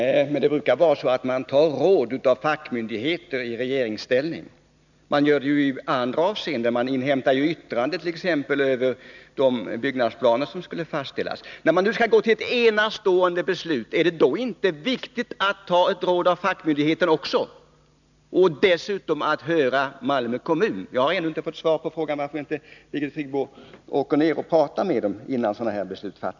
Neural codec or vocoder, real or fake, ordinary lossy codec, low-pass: none; real; none; 7.2 kHz